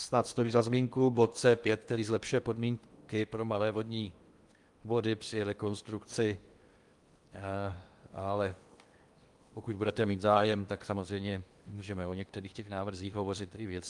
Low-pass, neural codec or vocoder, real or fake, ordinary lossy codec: 10.8 kHz; codec, 16 kHz in and 24 kHz out, 0.8 kbps, FocalCodec, streaming, 65536 codes; fake; Opus, 32 kbps